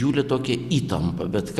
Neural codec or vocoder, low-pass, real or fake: none; 14.4 kHz; real